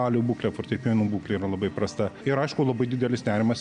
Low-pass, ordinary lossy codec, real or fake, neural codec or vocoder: 9.9 kHz; AAC, 96 kbps; real; none